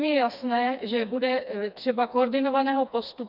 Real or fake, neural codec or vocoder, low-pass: fake; codec, 16 kHz, 2 kbps, FreqCodec, smaller model; 5.4 kHz